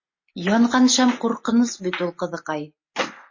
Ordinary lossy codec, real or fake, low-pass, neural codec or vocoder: MP3, 32 kbps; real; 7.2 kHz; none